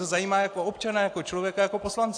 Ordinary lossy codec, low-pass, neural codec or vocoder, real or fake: AAC, 48 kbps; 9.9 kHz; none; real